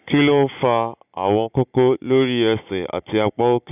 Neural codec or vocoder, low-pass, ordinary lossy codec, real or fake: none; 3.6 kHz; none; real